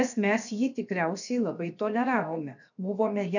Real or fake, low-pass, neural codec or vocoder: fake; 7.2 kHz; codec, 16 kHz, 0.7 kbps, FocalCodec